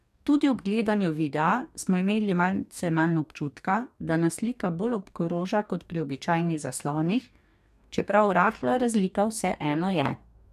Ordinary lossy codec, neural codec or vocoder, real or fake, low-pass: none; codec, 44.1 kHz, 2.6 kbps, DAC; fake; 14.4 kHz